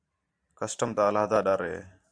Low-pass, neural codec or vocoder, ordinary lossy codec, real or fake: 9.9 kHz; vocoder, 44.1 kHz, 128 mel bands every 512 samples, BigVGAN v2; AAC, 64 kbps; fake